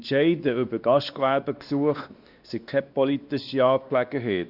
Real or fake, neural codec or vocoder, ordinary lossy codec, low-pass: fake; codec, 16 kHz, 2 kbps, X-Codec, WavLM features, trained on Multilingual LibriSpeech; Opus, 64 kbps; 5.4 kHz